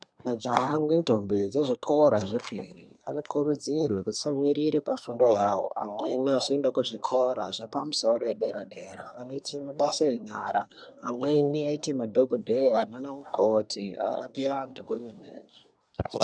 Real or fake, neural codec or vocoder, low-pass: fake; codec, 24 kHz, 1 kbps, SNAC; 9.9 kHz